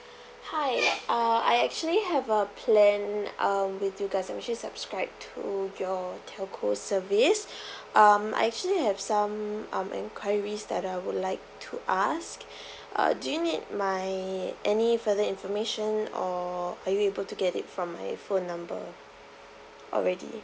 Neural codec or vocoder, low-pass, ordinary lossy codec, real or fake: none; none; none; real